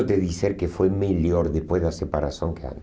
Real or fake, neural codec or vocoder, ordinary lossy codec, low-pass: real; none; none; none